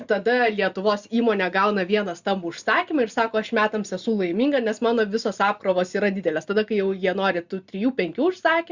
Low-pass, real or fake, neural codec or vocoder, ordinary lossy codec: 7.2 kHz; real; none; Opus, 64 kbps